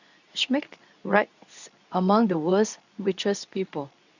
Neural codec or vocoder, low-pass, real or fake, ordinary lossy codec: codec, 24 kHz, 0.9 kbps, WavTokenizer, medium speech release version 2; 7.2 kHz; fake; none